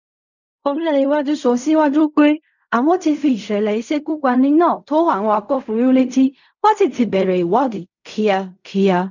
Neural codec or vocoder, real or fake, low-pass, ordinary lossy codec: codec, 16 kHz in and 24 kHz out, 0.4 kbps, LongCat-Audio-Codec, fine tuned four codebook decoder; fake; 7.2 kHz; none